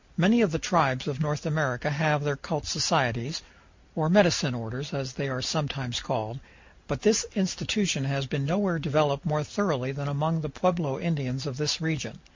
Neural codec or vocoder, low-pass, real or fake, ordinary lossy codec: none; 7.2 kHz; real; MP3, 64 kbps